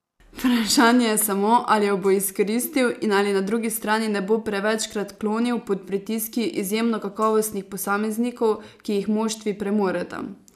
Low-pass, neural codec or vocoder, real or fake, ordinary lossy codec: 14.4 kHz; none; real; none